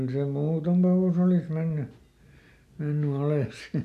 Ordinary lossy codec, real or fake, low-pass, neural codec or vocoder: none; real; 14.4 kHz; none